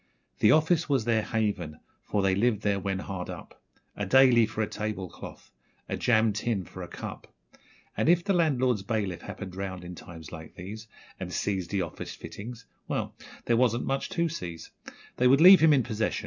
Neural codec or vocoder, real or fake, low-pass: none; real; 7.2 kHz